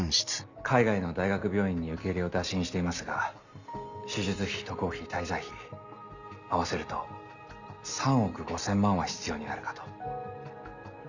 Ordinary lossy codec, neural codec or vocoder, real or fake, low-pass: none; none; real; 7.2 kHz